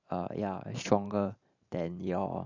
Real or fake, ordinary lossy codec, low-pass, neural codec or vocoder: fake; none; 7.2 kHz; vocoder, 44.1 kHz, 128 mel bands every 512 samples, BigVGAN v2